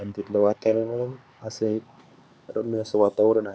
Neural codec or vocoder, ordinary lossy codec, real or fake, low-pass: codec, 16 kHz, 4 kbps, X-Codec, HuBERT features, trained on LibriSpeech; none; fake; none